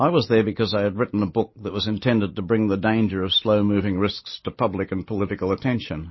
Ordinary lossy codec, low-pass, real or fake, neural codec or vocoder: MP3, 24 kbps; 7.2 kHz; real; none